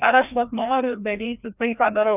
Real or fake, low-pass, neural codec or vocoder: fake; 3.6 kHz; codec, 16 kHz, 1 kbps, FreqCodec, larger model